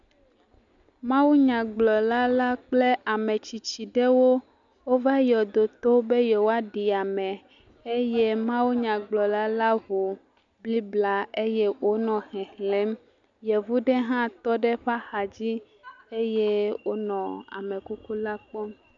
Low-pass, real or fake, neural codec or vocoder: 7.2 kHz; real; none